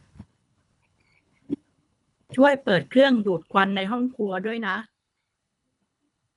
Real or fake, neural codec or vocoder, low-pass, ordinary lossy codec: fake; codec, 24 kHz, 3 kbps, HILCodec; 10.8 kHz; none